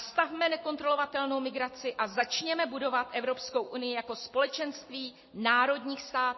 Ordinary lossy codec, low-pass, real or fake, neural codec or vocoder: MP3, 24 kbps; 7.2 kHz; real; none